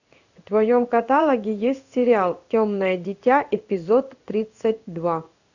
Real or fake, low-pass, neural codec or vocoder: fake; 7.2 kHz; codec, 16 kHz in and 24 kHz out, 1 kbps, XY-Tokenizer